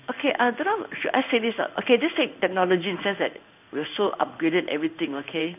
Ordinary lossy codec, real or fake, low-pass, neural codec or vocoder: none; fake; 3.6 kHz; codec, 16 kHz in and 24 kHz out, 1 kbps, XY-Tokenizer